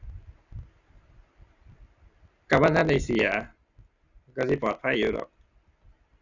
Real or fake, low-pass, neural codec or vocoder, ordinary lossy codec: real; 7.2 kHz; none; none